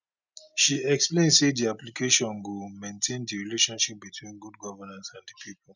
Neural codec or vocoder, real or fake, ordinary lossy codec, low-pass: none; real; none; 7.2 kHz